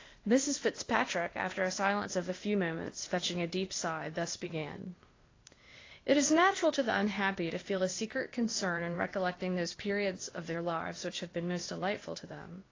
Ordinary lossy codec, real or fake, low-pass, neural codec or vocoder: AAC, 32 kbps; fake; 7.2 kHz; codec, 16 kHz, 0.8 kbps, ZipCodec